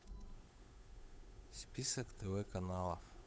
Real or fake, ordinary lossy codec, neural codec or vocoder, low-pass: fake; none; codec, 16 kHz, 8 kbps, FunCodec, trained on Chinese and English, 25 frames a second; none